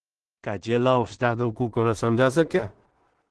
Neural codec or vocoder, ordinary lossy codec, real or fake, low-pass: codec, 16 kHz in and 24 kHz out, 0.4 kbps, LongCat-Audio-Codec, two codebook decoder; Opus, 16 kbps; fake; 10.8 kHz